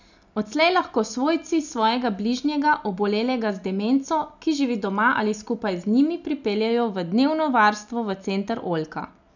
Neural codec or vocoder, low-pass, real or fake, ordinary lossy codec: none; 7.2 kHz; real; none